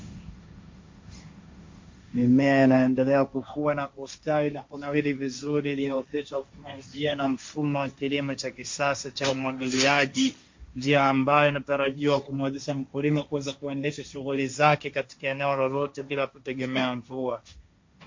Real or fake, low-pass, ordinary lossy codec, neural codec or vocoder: fake; 7.2 kHz; MP3, 48 kbps; codec, 16 kHz, 1.1 kbps, Voila-Tokenizer